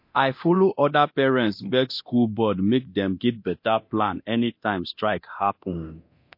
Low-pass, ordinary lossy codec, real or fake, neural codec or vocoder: 5.4 kHz; MP3, 32 kbps; fake; codec, 24 kHz, 0.9 kbps, DualCodec